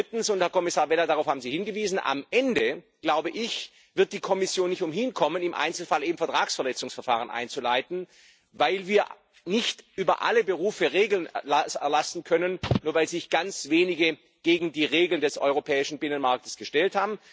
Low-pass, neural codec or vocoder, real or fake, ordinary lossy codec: none; none; real; none